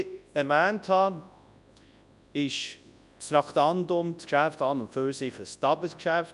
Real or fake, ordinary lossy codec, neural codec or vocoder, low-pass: fake; none; codec, 24 kHz, 0.9 kbps, WavTokenizer, large speech release; 10.8 kHz